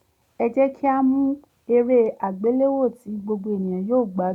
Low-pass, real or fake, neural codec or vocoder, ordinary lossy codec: 19.8 kHz; real; none; none